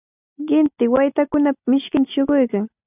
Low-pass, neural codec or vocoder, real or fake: 3.6 kHz; none; real